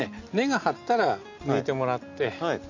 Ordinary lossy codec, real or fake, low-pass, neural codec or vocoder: AAC, 48 kbps; real; 7.2 kHz; none